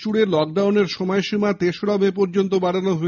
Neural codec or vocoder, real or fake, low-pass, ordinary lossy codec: none; real; 7.2 kHz; none